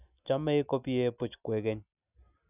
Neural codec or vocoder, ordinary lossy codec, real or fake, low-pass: none; none; real; 3.6 kHz